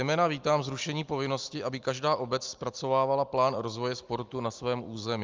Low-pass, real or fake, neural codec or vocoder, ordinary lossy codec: 7.2 kHz; real; none; Opus, 24 kbps